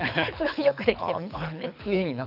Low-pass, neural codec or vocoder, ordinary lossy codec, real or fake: 5.4 kHz; codec, 24 kHz, 6 kbps, HILCodec; none; fake